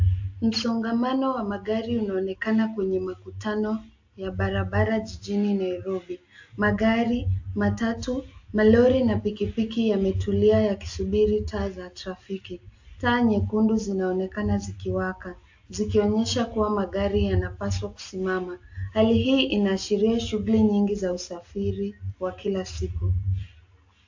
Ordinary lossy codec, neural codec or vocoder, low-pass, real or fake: AAC, 48 kbps; none; 7.2 kHz; real